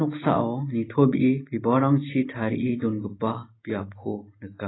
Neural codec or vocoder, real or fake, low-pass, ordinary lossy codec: none; real; 7.2 kHz; AAC, 16 kbps